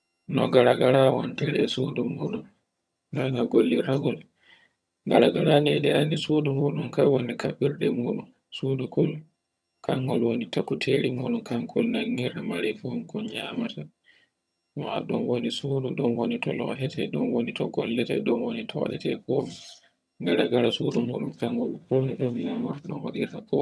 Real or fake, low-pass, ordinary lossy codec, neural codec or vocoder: fake; none; none; vocoder, 22.05 kHz, 80 mel bands, HiFi-GAN